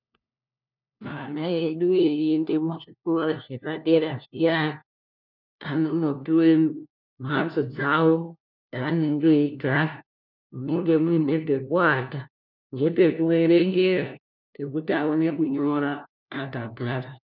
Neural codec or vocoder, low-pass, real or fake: codec, 16 kHz, 1 kbps, FunCodec, trained on LibriTTS, 50 frames a second; 5.4 kHz; fake